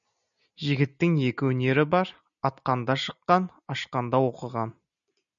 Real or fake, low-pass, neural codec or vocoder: real; 7.2 kHz; none